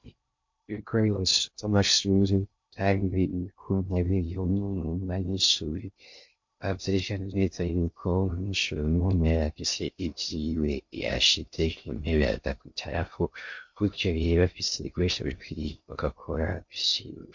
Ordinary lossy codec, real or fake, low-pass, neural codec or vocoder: MP3, 48 kbps; fake; 7.2 kHz; codec, 16 kHz in and 24 kHz out, 0.6 kbps, FocalCodec, streaming, 2048 codes